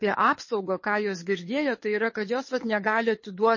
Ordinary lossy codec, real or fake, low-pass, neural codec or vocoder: MP3, 32 kbps; fake; 7.2 kHz; codec, 24 kHz, 6 kbps, HILCodec